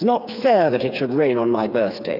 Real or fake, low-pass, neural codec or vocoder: fake; 5.4 kHz; codec, 16 kHz, 4 kbps, FreqCodec, smaller model